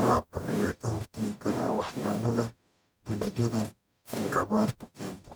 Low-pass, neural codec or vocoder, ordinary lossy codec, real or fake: none; codec, 44.1 kHz, 0.9 kbps, DAC; none; fake